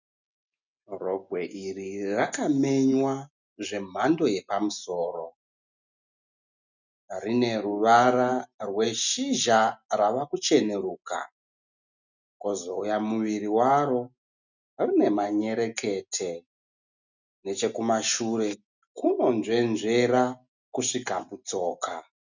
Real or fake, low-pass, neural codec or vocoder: real; 7.2 kHz; none